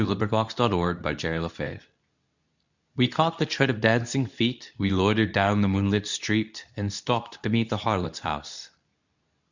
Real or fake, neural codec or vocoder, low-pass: fake; codec, 24 kHz, 0.9 kbps, WavTokenizer, medium speech release version 2; 7.2 kHz